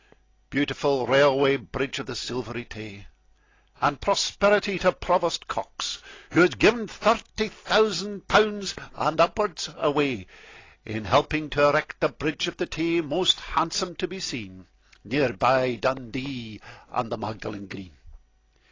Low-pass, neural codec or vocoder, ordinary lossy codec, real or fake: 7.2 kHz; none; AAC, 32 kbps; real